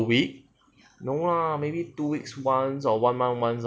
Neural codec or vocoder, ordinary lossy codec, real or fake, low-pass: none; none; real; none